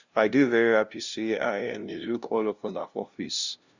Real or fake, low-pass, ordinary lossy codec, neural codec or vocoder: fake; 7.2 kHz; Opus, 64 kbps; codec, 16 kHz, 0.5 kbps, FunCodec, trained on LibriTTS, 25 frames a second